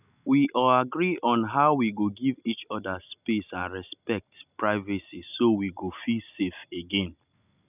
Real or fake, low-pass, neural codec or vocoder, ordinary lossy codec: real; 3.6 kHz; none; none